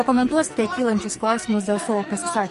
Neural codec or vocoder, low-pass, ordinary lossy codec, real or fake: codec, 44.1 kHz, 2.6 kbps, SNAC; 14.4 kHz; MP3, 48 kbps; fake